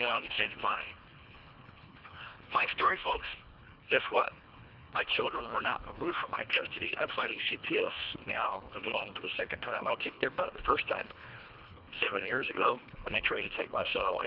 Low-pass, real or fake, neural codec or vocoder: 5.4 kHz; fake; codec, 24 kHz, 1.5 kbps, HILCodec